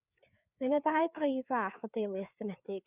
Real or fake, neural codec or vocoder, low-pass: fake; codec, 16 kHz, 4 kbps, FreqCodec, larger model; 3.6 kHz